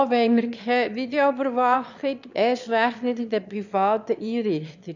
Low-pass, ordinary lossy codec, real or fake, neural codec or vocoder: 7.2 kHz; none; fake; autoencoder, 22.05 kHz, a latent of 192 numbers a frame, VITS, trained on one speaker